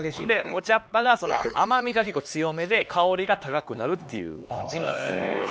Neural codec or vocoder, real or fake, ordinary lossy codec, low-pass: codec, 16 kHz, 2 kbps, X-Codec, HuBERT features, trained on LibriSpeech; fake; none; none